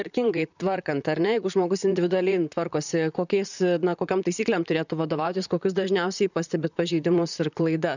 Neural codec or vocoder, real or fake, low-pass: vocoder, 44.1 kHz, 128 mel bands, Pupu-Vocoder; fake; 7.2 kHz